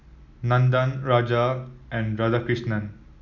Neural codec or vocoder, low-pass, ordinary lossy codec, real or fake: none; 7.2 kHz; none; real